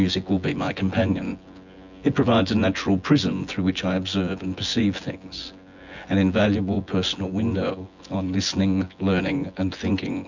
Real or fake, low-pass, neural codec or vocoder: fake; 7.2 kHz; vocoder, 24 kHz, 100 mel bands, Vocos